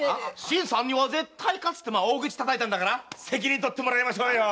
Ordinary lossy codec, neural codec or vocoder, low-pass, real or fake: none; none; none; real